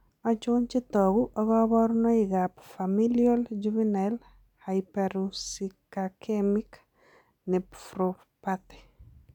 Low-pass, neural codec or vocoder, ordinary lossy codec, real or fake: 19.8 kHz; none; none; real